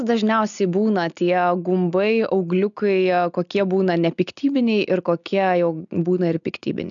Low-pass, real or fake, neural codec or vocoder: 7.2 kHz; real; none